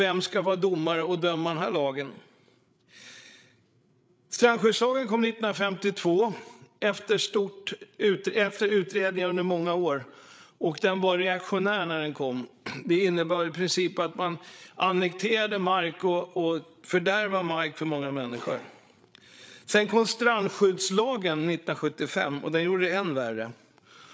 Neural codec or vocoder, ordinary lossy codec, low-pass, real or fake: codec, 16 kHz, 8 kbps, FreqCodec, larger model; none; none; fake